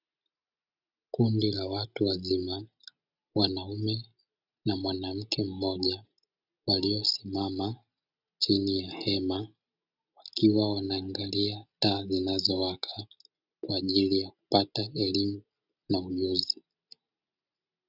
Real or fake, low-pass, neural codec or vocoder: real; 5.4 kHz; none